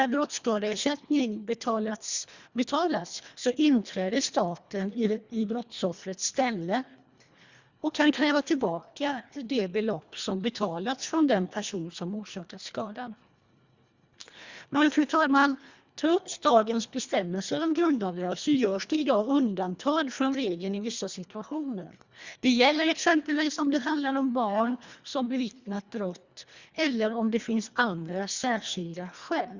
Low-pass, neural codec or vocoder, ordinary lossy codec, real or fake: 7.2 kHz; codec, 24 kHz, 1.5 kbps, HILCodec; Opus, 64 kbps; fake